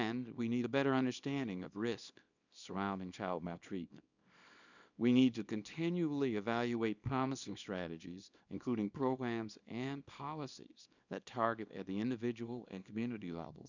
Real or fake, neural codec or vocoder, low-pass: fake; codec, 24 kHz, 0.9 kbps, WavTokenizer, small release; 7.2 kHz